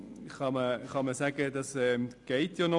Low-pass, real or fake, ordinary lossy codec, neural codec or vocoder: 10.8 kHz; real; none; none